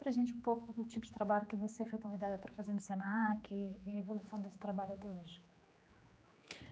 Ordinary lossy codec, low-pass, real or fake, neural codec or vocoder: none; none; fake; codec, 16 kHz, 2 kbps, X-Codec, HuBERT features, trained on general audio